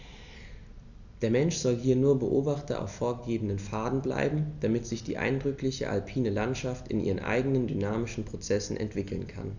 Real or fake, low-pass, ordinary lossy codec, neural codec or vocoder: real; 7.2 kHz; none; none